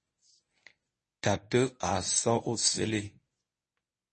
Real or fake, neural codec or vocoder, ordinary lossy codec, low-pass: fake; codec, 24 kHz, 0.9 kbps, WavTokenizer, medium speech release version 1; MP3, 32 kbps; 10.8 kHz